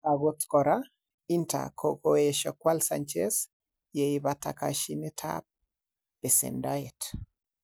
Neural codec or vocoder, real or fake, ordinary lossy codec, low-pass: none; real; none; none